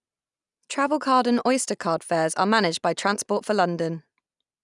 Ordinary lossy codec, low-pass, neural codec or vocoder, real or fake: none; 10.8 kHz; none; real